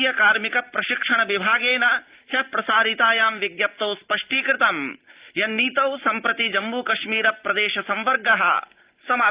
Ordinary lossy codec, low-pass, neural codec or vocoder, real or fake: Opus, 32 kbps; 3.6 kHz; none; real